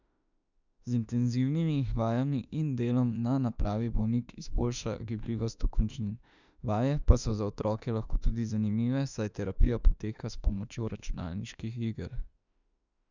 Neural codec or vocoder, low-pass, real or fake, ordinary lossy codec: autoencoder, 48 kHz, 32 numbers a frame, DAC-VAE, trained on Japanese speech; 7.2 kHz; fake; none